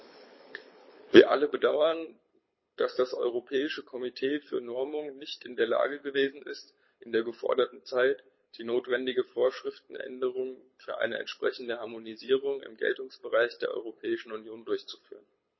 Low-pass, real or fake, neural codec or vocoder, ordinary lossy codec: 7.2 kHz; fake; codec, 24 kHz, 6 kbps, HILCodec; MP3, 24 kbps